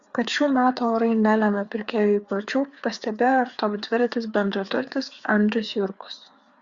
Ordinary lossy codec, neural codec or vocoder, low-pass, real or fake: Opus, 64 kbps; codec, 16 kHz, 4 kbps, FreqCodec, larger model; 7.2 kHz; fake